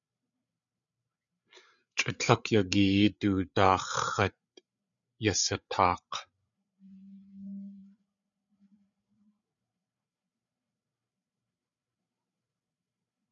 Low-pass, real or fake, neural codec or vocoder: 7.2 kHz; fake; codec, 16 kHz, 8 kbps, FreqCodec, larger model